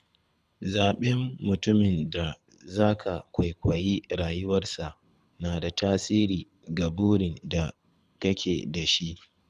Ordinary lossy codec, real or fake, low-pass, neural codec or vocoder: none; fake; none; codec, 24 kHz, 6 kbps, HILCodec